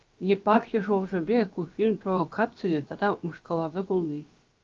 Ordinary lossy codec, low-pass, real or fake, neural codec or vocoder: Opus, 24 kbps; 7.2 kHz; fake; codec, 16 kHz, about 1 kbps, DyCAST, with the encoder's durations